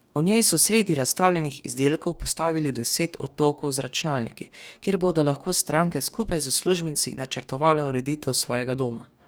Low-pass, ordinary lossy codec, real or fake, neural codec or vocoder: none; none; fake; codec, 44.1 kHz, 2.6 kbps, DAC